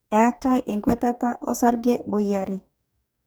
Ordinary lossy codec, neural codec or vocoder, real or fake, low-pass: none; codec, 44.1 kHz, 2.6 kbps, DAC; fake; none